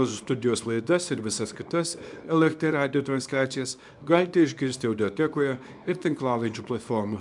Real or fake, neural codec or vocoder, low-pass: fake; codec, 24 kHz, 0.9 kbps, WavTokenizer, small release; 10.8 kHz